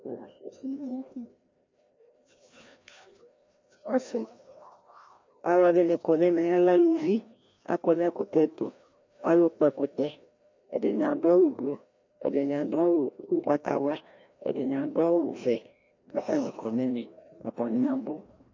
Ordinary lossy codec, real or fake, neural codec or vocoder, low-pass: MP3, 48 kbps; fake; codec, 16 kHz, 1 kbps, FreqCodec, larger model; 7.2 kHz